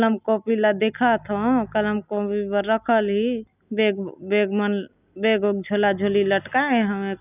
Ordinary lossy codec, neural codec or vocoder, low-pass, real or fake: none; none; 3.6 kHz; real